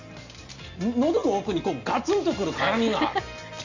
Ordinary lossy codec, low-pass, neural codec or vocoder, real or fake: Opus, 64 kbps; 7.2 kHz; none; real